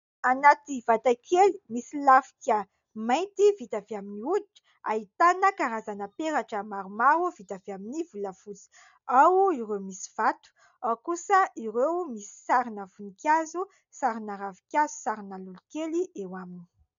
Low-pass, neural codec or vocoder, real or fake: 7.2 kHz; none; real